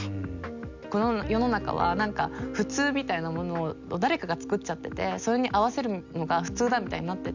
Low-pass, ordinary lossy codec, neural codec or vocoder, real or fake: 7.2 kHz; none; none; real